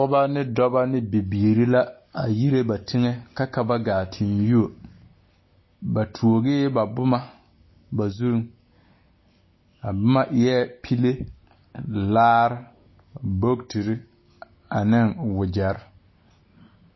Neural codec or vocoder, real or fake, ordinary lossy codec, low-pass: none; real; MP3, 24 kbps; 7.2 kHz